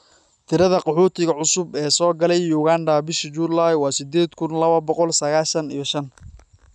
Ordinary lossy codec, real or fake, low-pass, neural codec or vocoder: none; real; none; none